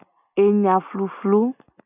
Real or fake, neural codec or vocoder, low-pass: real; none; 3.6 kHz